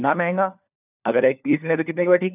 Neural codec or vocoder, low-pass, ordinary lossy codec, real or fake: codec, 16 kHz, 4 kbps, FunCodec, trained on LibriTTS, 50 frames a second; 3.6 kHz; none; fake